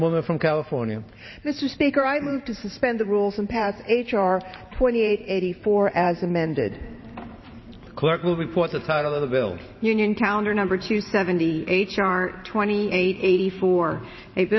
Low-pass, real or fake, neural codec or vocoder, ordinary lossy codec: 7.2 kHz; fake; vocoder, 44.1 kHz, 128 mel bands every 512 samples, BigVGAN v2; MP3, 24 kbps